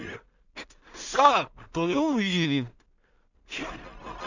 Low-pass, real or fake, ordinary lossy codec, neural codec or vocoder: 7.2 kHz; fake; none; codec, 16 kHz in and 24 kHz out, 0.4 kbps, LongCat-Audio-Codec, two codebook decoder